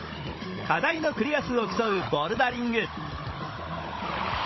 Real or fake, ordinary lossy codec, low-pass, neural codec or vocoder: fake; MP3, 24 kbps; 7.2 kHz; codec, 16 kHz, 16 kbps, FunCodec, trained on Chinese and English, 50 frames a second